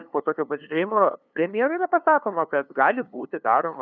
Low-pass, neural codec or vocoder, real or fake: 7.2 kHz; codec, 16 kHz, 2 kbps, FunCodec, trained on LibriTTS, 25 frames a second; fake